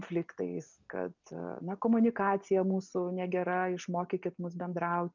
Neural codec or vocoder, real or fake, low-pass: none; real; 7.2 kHz